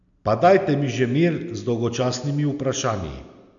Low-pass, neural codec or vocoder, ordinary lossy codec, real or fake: 7.2 kHz; none; none; real